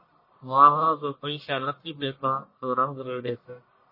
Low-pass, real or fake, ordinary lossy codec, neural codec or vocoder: 5.4 kHz; fake; MP3, 24 kbps; codec, 44.1 kHz, 1.7 kbps, Pupu-Codec